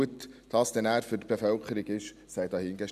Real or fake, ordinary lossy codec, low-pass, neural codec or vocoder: real; none; 14.4 kHz; none